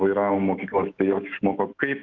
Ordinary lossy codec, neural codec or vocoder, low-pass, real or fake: Opus, 32 kbps; autoencoder, 48 kHz, 128 numbers a frame, DAC-VAE, trained on Japanese speech; 7.2 kHz; fake